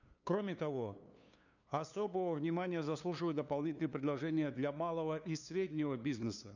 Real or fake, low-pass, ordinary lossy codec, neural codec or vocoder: fake; 7.2 kHz; none; codec, 16 kHz, 2 kbps, FunCodec, trained on LibriTTS, 25 frames a second